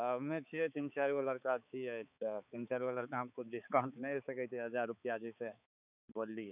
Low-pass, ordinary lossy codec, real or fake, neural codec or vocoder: 3.6 kHz; none; fake; codec, 16 kHz, 4 kbps, X-Codec, HuBERT features, trained on balanced general audio